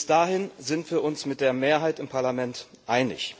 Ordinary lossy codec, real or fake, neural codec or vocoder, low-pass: none; real; none; none